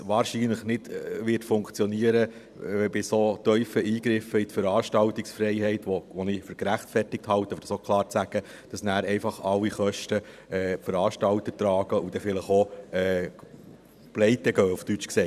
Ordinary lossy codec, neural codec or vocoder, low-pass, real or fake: none; vocoder, 44.1 kHz, 128 mel bands every 512 samples, BigVGAN v2; 14.4 kHz; fake